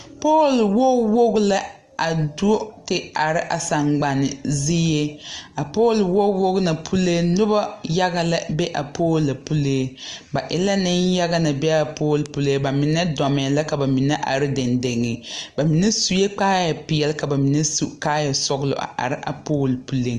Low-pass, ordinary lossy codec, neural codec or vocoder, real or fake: 10.8 kHz; Opus, 24 kbps; none; real